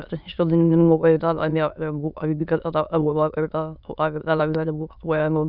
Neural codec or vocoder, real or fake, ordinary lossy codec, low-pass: autoencoder, 22.05 kHz, a latent of 192 numbers a frame, VITS, trained on many speakers; fake; none; 5.4 kHz